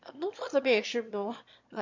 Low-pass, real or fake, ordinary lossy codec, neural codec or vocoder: 7.2 kHz; fake; MP3, 48 kbps; autoencoder, 22.05 kHz, a latent of 192 numbers a frame, VITS, trained on one speaker